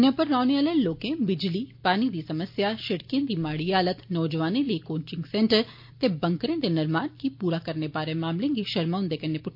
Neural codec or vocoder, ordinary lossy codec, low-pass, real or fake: none; MP3, 32 kbps; 5.4 kHz; real